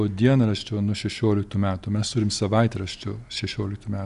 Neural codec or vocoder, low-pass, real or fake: none; 10.8 kHz; real